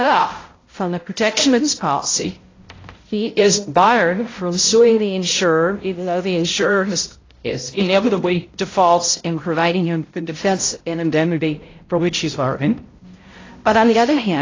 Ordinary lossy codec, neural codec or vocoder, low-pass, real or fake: AAC, 32 kbps; codec, 16 kHz, 0.5 kbps, X-Codec, HuBERT features, trained on balanced general audio; 7.2 kHz; fake